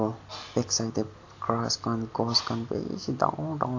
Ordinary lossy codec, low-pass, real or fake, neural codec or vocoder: AAC, 48 kbps; 7.2 kHz; real; none